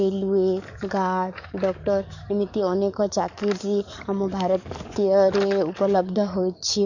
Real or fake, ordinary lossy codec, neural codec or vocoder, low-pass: fake; none; codec, 44.1 kHz, 7.8 kbps, DAC; 7.2 kHz